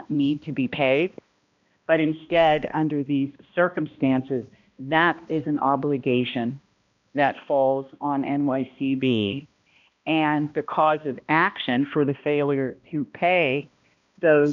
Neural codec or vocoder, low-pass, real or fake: codec, 16 kHz, 1 kbps, X-Codec, HuBERT features, trained on balanced general audio; 7.2 kHz; fake